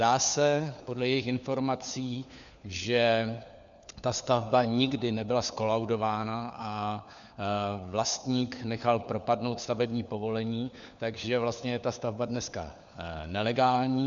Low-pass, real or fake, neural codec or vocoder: 7.2 kHz; fake; codec, 16 kHz, 4 kbps, FunCodec, trained on LibriTTS, 50 frames a second